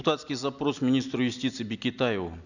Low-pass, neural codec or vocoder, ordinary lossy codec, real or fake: 7.2 kHz; none; none; real